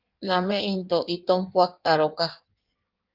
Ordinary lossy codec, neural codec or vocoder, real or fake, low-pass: Opus, 24 kbps; codec, 16 kHz in and 24 kHz out, 1.1 kbps, FireRedTTS-2 codec; fake; 5.4 kHz